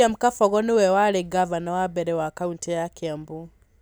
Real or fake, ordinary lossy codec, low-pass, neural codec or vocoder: real; none; none; none